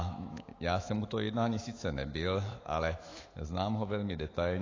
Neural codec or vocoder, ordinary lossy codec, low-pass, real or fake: autoencoder, 48 kHz, 128 numbers a frame, DAC-VAE, trained on Japanese speech; AAC, 32 kbps; 7.2 kHz; fake